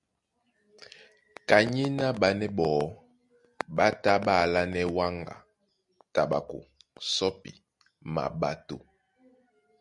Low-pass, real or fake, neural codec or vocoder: 10.8 kHz; real; none